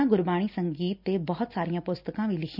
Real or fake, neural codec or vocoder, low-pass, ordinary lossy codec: real; none; 5.4 kHz; none